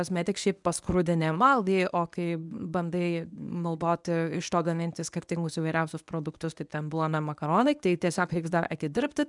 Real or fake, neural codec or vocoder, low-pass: fake; codec, 24 kHz, 0.9 kbps, WavTokenizer, medium speech release version 1; 10.8 kHz